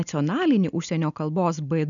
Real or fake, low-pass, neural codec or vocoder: real; 7.2 kHz; none